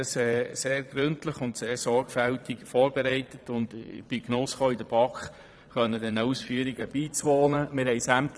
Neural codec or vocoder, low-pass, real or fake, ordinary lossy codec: vocoder, 22.05 kHz, 80 mel bands, Vocos; none; fake; none